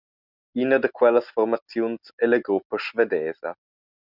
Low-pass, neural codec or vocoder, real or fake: 5.4 kHz; none; real